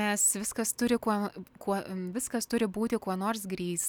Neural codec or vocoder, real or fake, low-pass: none; real; 19.8 kHz